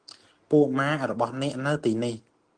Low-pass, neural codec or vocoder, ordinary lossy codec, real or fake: 9.9 kHz; none; Opus, 24 kbps; real